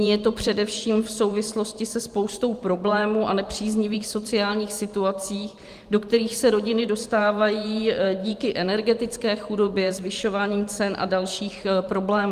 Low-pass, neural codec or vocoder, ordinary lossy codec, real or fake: 14.4 kHz; vocoder, 44.1 kHz, 128 mel bands every 512 samples, BigVGAN v2; Opus, 32 kbps; fake